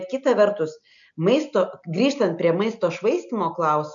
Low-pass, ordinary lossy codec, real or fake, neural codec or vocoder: 7.2 kHz; MP3, 96 kbps; real; none